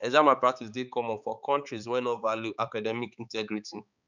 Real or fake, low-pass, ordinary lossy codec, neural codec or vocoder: fake; 7.2 kHz; none; codec, 16 kHz, 4 kbps, X-Codec, HuBERT features, trained on balanced general audio